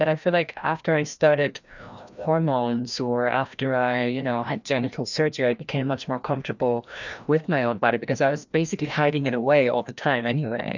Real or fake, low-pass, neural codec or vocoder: fake; 7.2 kHz; codec, 16 kHz, 1 kbps, FreqCodec, larger model